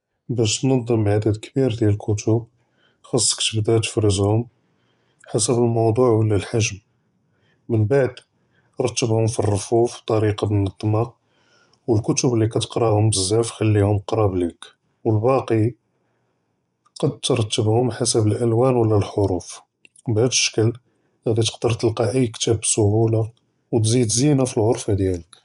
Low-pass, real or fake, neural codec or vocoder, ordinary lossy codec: 10.8 kHz; fake; vocoder, 24 kHz, 100 mel bands, Vocos; none